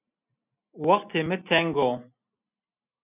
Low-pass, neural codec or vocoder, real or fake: 3.6 kHz; none; real